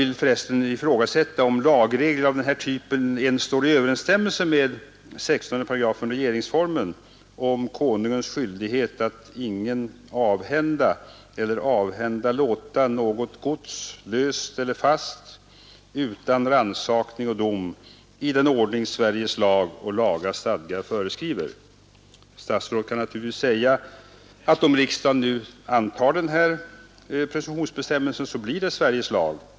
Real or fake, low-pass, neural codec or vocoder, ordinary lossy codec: real; none; none; none